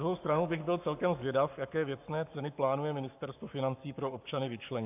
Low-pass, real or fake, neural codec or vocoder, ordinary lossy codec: 3.6 kHz; fake; codec, 44.1 kHz, 7.8 kbps, Pupu-Codec; AAC, 32 kbps